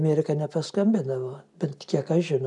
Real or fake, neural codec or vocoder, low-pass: real; none; 10.8 kHz